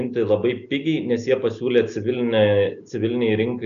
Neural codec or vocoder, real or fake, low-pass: none; real; 7.2 kHz